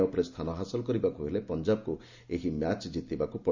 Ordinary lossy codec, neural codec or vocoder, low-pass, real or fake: Opus, 64 kbps; none; 7.2 kHz; real